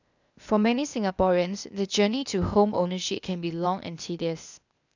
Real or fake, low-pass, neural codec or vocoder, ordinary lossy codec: fake; 7.2 kHz; codec, 16 kHz, 0.8 kbps, ZipCodec; none